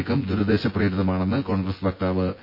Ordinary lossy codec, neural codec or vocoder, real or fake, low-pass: MP3, 32 kbps; vocoder, 24 kHz, 100 mel bands, Vocos; fake; 5.4 kHz